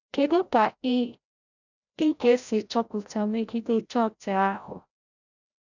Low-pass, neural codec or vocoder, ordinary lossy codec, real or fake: 7.2 kHz; codec, 16 kHz, 0.5 kbps, FreqCodec, larger model; none; fake